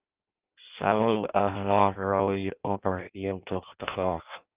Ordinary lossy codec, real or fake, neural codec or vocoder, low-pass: Opus, 24 kbps; fake; codec, 16 kHz in and 24 kHz out, 0.6 kbps, FireRedTTS-2 codec; 3.6 kHz